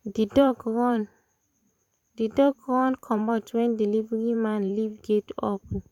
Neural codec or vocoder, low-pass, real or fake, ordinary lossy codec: vocoder, 44.1 kHz, 128 mel bands every 512 samples, BigVGAN v2; 19.8 kHz; fake; none